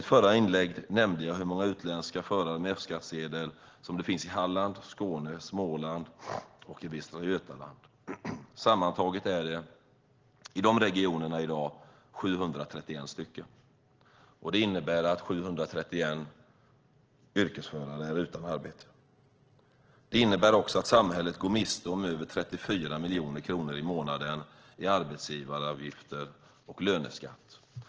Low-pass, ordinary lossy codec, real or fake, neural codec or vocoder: 7.2 kHz; Opus, 16 kbps; real; none